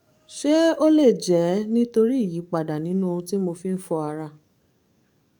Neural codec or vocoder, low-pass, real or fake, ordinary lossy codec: codec, 44.1 kHz, 7.8 kbps, DAC; 19.8 kHz; fake; none